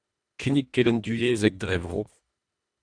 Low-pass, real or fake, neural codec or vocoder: 9.9 kHz; fake; codec, 24 kHz, 1.5 kbps, HILCodec